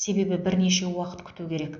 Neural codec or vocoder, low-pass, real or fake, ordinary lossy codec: none; 7.2 kHz; real; AAC, 64 kbps